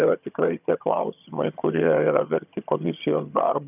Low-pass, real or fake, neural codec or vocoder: 3.6 kHz; fake; vocoder, 22.05 kHz, 80 mel bands, HiFi-GAN